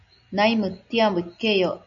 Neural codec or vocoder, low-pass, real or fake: none; 7.2 kHz; real